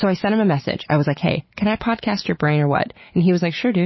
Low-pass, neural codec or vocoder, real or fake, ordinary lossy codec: 7.2 kHz; none; real; MP3, 24 kbps